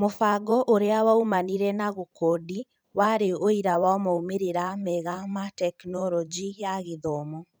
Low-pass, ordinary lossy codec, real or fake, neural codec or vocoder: none; none; fake; vocoder, 44.1 kHz, 128 mel bands every 512 samples, BigVGAN v2